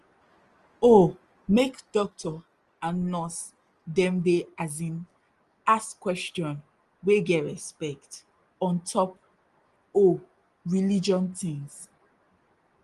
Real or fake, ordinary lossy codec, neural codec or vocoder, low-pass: real; Opus, 24 kbps; none; 10.8 kHz